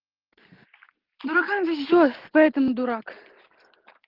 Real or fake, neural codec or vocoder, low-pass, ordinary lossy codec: real; none; 5.4 kHz; Opus, 16 kbps